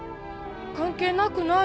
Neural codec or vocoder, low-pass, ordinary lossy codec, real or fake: none; none; none; real